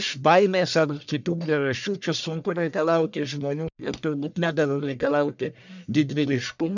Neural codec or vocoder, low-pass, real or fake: codec, 44.1 kHz, 1.7 kbps, Pupu-Codec; 7.2 kHz; fake